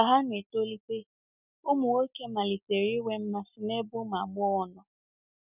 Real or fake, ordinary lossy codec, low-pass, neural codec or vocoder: real; none; 3.6 kHz; none